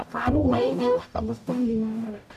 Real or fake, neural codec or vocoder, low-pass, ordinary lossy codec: fake; codec, 44.1 kHz, 0.9 kbps, DAC; 14.4 kHz; none